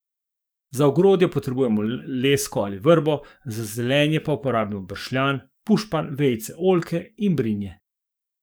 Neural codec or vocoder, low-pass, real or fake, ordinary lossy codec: codec, 44.1 kHz, 7.8 kbps, DAC; none; fake; none